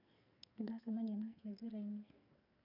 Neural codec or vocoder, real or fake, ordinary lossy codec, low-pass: codec, 44.1 kHz, 2.6 kbps, SNAC; fake; none; 5.4 kHz